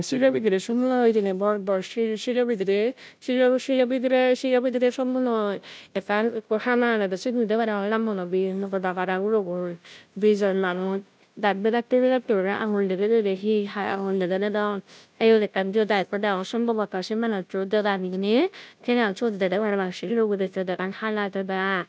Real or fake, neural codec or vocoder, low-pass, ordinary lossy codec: fake; codec, 16 kHz, 0.5 kbps, FunCodec, trained on Chinese and English, 25 frames a second; none; none